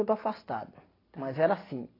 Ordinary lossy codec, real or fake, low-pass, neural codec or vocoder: AAC, 24 kbps; real; 5.4 kHz; none